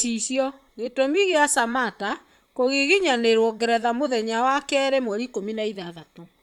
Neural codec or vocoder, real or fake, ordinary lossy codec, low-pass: vocoder, 44.1 kHz, 128 mel bands, Pupu-Vocoder; fake; none; 19.8 kHz